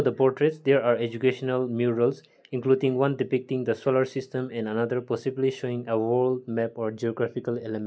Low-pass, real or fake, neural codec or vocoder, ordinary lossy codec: none; real; none; none